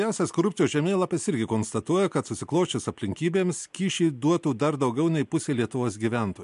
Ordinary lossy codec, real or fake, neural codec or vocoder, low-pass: MP3, 64 kbps; real; none; 10.8 kHz